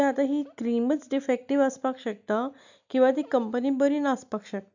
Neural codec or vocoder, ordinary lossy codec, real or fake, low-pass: none; none; real; 7.2 kHz